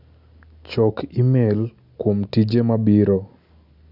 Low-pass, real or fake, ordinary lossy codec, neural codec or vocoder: 5.4 kHz; real; none; none